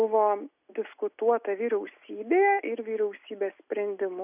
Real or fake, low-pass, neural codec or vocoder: real; 3.6 kHz; none